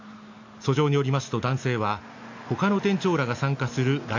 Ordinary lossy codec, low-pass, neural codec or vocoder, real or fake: AAC, 48 kbps; 7.2 kHz; none; real